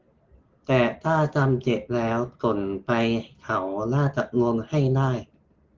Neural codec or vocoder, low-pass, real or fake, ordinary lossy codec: none; 7.2 kHz; real; Opus, 16 kbps